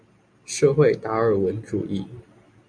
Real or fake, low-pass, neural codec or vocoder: real; 9.9 kHz; none